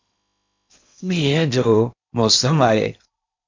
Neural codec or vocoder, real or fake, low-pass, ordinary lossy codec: codec, 16 kHz in and 24 kHz out, 0.8 kbps, FocalCodec, streaming, 65536 codes; fake; 7.2 kHz; MP3, 64 kbps